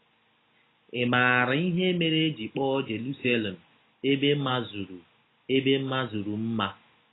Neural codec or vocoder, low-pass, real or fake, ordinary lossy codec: none; 7.2 kHz; real; AAC, 16 kbps